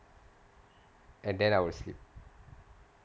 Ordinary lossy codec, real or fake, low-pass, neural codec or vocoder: none; real; none; none